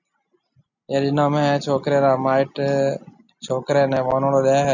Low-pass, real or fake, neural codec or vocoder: 7.2 kHz; real; none